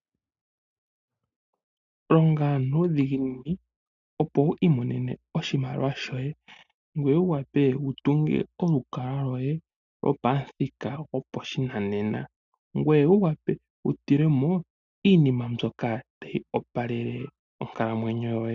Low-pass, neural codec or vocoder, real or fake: 7.2 kHz; none; real